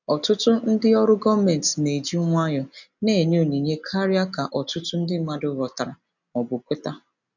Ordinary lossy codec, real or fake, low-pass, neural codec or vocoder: none; real; 7.2 kHz; none